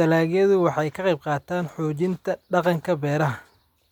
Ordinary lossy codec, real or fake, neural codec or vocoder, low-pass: none; real; none; 19.8 kHz